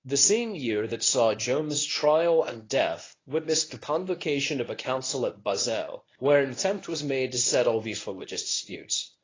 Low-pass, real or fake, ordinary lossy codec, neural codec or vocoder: 7.2 kHz; fake; AAC, 32 kbps; codec, 24 kHz, 0.9 kbps, WavTokenizer, medium speech release version 1